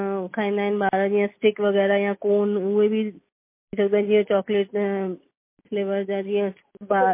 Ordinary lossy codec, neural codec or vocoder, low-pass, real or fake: MP3, 24 kbps; none; 3.6 kHz; real